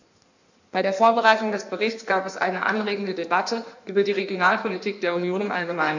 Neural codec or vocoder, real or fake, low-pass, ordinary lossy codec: codec, 16 kHz in and 24 kHz out, 1.1 kbps, FireRedTTS-2 codec; fake; 7.2 kHz; none